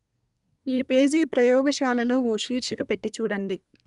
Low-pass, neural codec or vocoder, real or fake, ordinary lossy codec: 10.8 kHz; codec, 24 kHz, 1 kbps, SNAC; fake; none